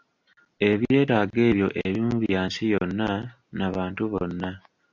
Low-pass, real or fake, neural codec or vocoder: 7.2 kHz; real; none